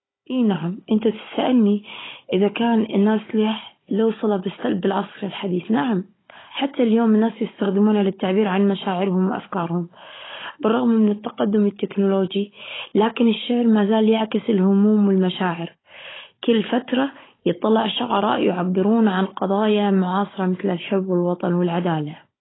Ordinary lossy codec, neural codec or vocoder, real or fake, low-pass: AAC, 16 kbps; codec, 16 kHz, 16 kbps, FunCodec, trained on Chinese and English, 50 frames a second; fake; 7.2 kHz